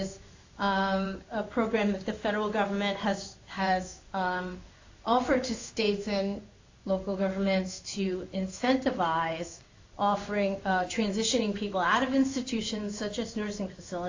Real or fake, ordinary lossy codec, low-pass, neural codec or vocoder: fake; AAC, 48 kbps; 7.2 kHz; codec, 16 kHz in and 24 kHz out, 1 kbps, XY-Tokenizer